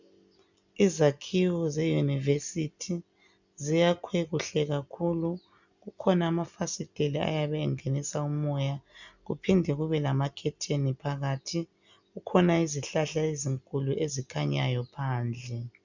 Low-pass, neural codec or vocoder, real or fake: 7.2 kHz; none; real